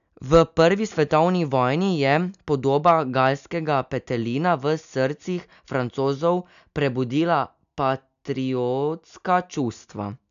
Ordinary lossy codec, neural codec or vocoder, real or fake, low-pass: none; none; real; 7.2 kHz